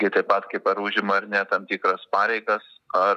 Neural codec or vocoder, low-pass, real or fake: none; 14.4 kHz; real